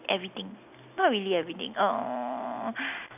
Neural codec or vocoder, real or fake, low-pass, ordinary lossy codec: none; real; 3.6 kHz; none